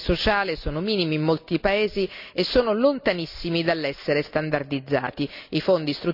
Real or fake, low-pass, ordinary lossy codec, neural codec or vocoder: real; 5.4 kHz; none; none